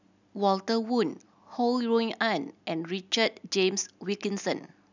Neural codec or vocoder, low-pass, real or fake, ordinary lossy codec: none; 7.2 kHz; real; none